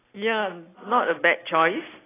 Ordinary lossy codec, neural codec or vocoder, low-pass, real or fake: AAC, 16 kbps; none; 3.6 kHz; real